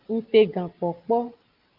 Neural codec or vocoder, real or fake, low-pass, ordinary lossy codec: none; real; 5.4 kHz; Opus, 32 kbps